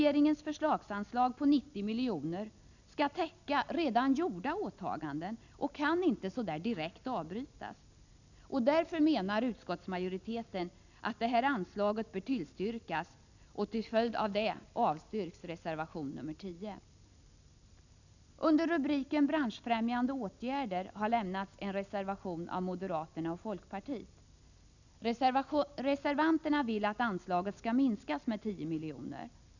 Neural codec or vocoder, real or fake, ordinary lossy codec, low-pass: none; real; none; 7.2 kHz